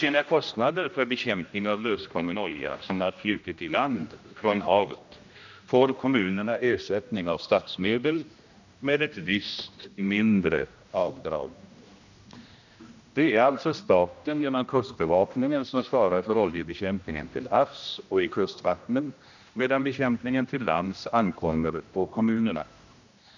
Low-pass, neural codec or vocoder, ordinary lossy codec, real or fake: 7.2 kHz; codec, 16 kHz, 1 kbps, X-Codec, HuBERT features, trained on general audio; none; fake